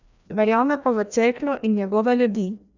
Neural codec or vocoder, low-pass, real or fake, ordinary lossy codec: codec, 16 kHz, 1 kbps, FreqCodec, larger model; 7.2 kHz; fake; none